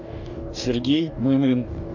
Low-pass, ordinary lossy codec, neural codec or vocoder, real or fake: 7.2 kHz; AAC, 48 kbps; codec, 44.1 kHz, 2.6 kbps, DAC; fake